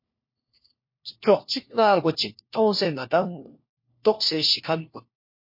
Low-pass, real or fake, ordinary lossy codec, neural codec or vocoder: 5.4 kHz; fake; MP3, 32 kbps; codec, 16 kHz, 1 kbps, FunCodec, trained on LibriTTS, 50 frames a second